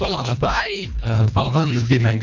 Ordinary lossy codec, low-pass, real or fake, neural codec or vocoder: none; 7.2 kHz; fake; codec, 24 kHz, 1.5 kbps, HILCodec